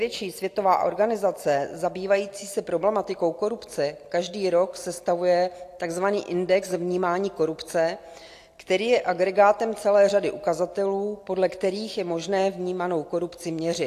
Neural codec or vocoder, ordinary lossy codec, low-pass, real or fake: none; AAC, 64 kbps; 14.4 kHz; real